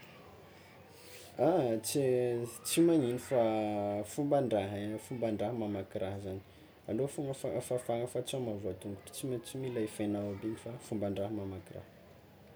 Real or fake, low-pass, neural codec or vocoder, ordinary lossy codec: real; none; none; none